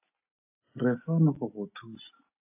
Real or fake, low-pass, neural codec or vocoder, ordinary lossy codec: real; 3.6 kHz; none; AAC, 24 kbps